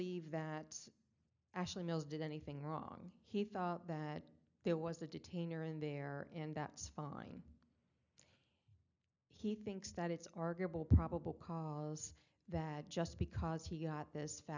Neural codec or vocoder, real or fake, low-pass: none; real; 7.2 kHz